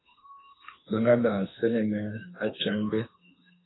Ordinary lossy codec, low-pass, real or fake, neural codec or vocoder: AAC, 16 kbps; 7.2 kHz; fake; autoencoder, 48 kHz, 32 numbers a frame, DAC-VAE, trained on Japanese speech